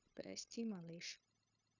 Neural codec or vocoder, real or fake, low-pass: codec, 16 kHz, 0.9 kbps, LongCat-Audio-Codec; fake; 7.2 kHz